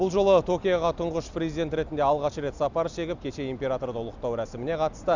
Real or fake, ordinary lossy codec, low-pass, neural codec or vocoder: real; Opus, 64 kbps; 7.2 kHz; none